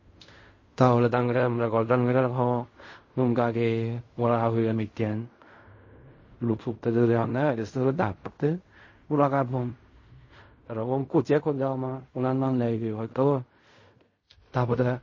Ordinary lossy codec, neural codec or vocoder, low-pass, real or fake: MP3, 32 kbps; codec, 16 kHz in and 24 kHz out, 0.4 kbps, LongCat-Audio-Codec, fine tuned four codebook decoder; 7.2 kHz; fake